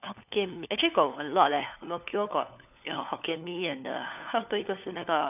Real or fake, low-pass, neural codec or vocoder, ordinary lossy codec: fake; 3.6 kHz; codec, 16 kHz, 4 kbps, FunCodec, trained on LibriTTS, 50 frames a second; none